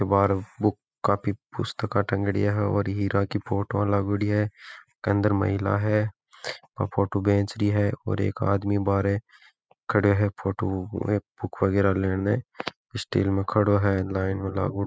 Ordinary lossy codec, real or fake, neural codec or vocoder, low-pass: none; real; none; none